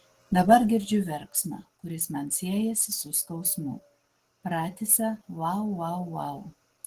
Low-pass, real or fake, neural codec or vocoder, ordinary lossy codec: 14.4 kHz; real; none; Opus, 16 kbps